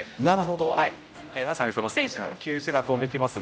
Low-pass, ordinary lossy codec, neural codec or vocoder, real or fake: none; none; codec, 16 kHz, 0.5 kbps, X-Codec, HuBERT features, trained on general audio; fake